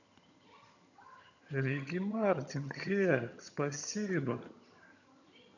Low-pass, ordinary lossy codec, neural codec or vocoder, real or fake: 7.2 kHz; none; vocoder, 22.05 kHz, 80 mel bands, HiFi-GAN; fake